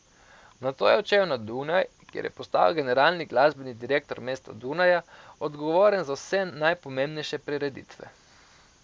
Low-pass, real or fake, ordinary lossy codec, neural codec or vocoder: none; real; none; none